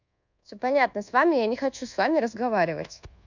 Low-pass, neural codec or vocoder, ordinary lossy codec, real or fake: 7.2 kHz; codec, 24 kHz, 1.2 kbps, DualCodec; none; fake